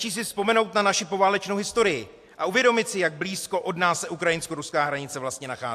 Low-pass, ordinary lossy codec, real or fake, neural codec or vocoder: 14.4 kHz; AAC, 64 kbps; real; none